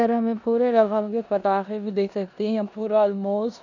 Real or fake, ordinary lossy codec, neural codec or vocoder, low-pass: fake; none; codec, 16 kHz in and 24 kHz out, 0.9 kbps, LongCat-Audio-Codec, four codebook decoder; 7.2 kHz